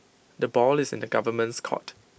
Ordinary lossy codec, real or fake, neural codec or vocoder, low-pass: none; real; none; none